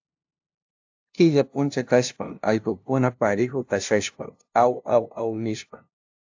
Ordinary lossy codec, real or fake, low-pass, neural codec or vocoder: AAC, 48 kbps; fake; 7.2 kHz; codec, 16 kHz, 0.5 kbps, FunCodec, trained on LibriTTS, 25 frames a second